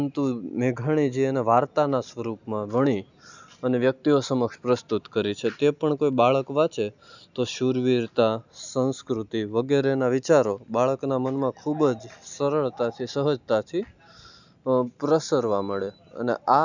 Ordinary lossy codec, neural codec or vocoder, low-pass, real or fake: none; none; 7.2 kHz; real